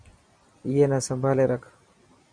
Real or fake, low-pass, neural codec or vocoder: real; 9.9 kHz; none